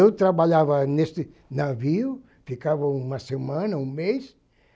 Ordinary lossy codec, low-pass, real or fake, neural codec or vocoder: none; none; real; none